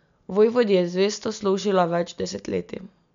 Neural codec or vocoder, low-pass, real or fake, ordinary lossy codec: none; 7.2 kHz; real; MP3, 64 kbps